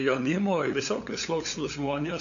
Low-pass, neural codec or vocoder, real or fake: 7.2 kHz; codec, 16 kHz, 8 kbps, FunCodec, trained on LibriTTS, 25 frames a second; fake